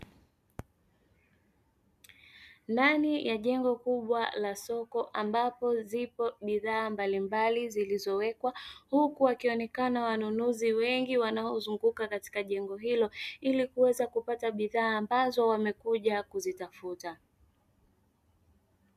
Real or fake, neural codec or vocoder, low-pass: real; none; 14.4 kHz